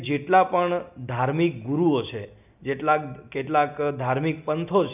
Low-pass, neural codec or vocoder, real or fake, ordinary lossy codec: 3.6 kHz; none; real; none